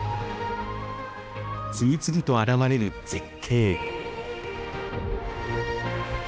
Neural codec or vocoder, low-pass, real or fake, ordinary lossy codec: codec, 16 kHz, 1 kbps, X-Codec, HuBERT features, trained on balanced general audio; none; fake; none